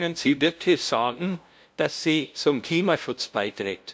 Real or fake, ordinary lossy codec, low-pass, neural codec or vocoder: fake; none; none; codec, 16 kHz, 0.5 kbps, FunCodec, trained on LibriTTS, 25 frames a second